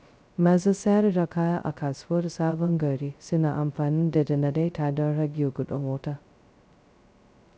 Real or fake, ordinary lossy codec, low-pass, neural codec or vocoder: fake; none; none; codec, 16 kHz, 0.2 kbps, FocalCodec